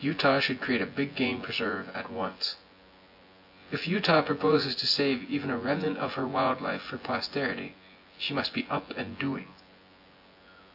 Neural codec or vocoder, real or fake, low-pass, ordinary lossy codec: vocoder, 24 kHz, 100 mel bands, Vocos; fake; 5.4 kHz; AAC, 48 kbps